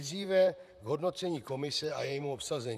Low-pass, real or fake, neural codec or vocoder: 14.4 kHz; fake; vocoder, 44.1 kHz, 128 mel bands, Pupu-Vocoder